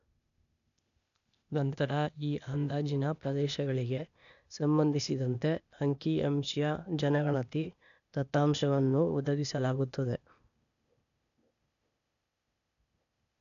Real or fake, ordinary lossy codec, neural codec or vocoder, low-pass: fake; none; codec, 16 kHz, 0.8 kbps, ZipCodec; 7.2 kHz